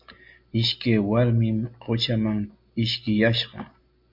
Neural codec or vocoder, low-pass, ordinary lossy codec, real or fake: none; 5.4 kHz; MP3, 48 kbps; real